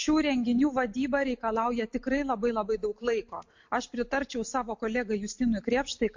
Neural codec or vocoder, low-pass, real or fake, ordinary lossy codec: none; 7.2 kHz; real; MP3, 48 kbps